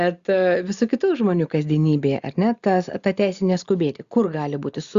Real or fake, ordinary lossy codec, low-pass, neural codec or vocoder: real; Opus, 64 kbps; 7.2 kHz; none